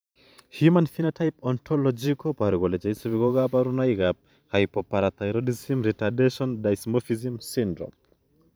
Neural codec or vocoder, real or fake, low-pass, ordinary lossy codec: vocoder, 44.1 kHz, 128 mel bands every 512 samples, BigVGAN v2; fake; none; none